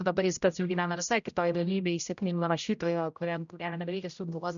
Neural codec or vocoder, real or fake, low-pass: codec, 16 kHz, 0.5 kbps, X-Codec, HuBERT features, trained on general audio; fake; 7.2 kHz